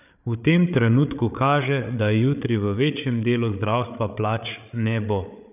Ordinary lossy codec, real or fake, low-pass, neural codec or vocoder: none; fake; 3.6 kHz; codec, 16 kHz, 8 kbps, FreqCodec, larger model